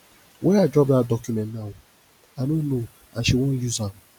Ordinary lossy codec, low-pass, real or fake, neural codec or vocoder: none; 19.8 kHz; real; none